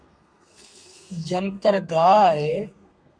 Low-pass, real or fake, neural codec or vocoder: 9.9 kHz; fake; codec, 32 kHz, 1.9 kbps, SNAC